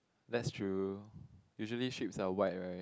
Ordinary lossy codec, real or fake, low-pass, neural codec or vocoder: none; real; none; none